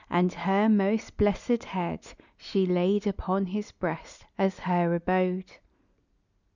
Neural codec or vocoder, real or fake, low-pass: none; real; 7.2 kHz